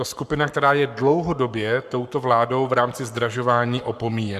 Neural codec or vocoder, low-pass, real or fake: codec, 44.1 kHz, 7.8 kbps, Pupu-Codec; 14.4 kHz; fake